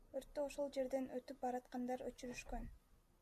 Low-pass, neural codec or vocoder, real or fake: 14.4 kHz; none; real